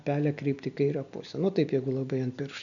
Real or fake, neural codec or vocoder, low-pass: real; none; 7.2 kHz